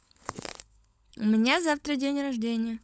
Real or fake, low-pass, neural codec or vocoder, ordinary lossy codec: fake; none; codec, 16 kHz, 16 kbps, FunCodec, trained on LibriTTS, 50 frames a second; none